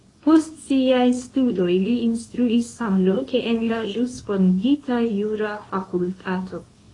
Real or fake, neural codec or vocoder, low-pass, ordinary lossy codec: fake; codec, 24 kHz, 0.9 kbps, WavTokenizer, small release; 10.8 kHz; AAC, 32 kbps